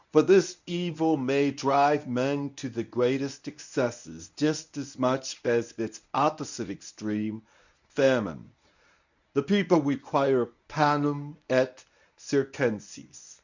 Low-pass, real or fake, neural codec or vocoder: 7.2 kHz; fake; codec, 24 kHz, 0.9 kbps, WavTokenizer, medium speech release version 1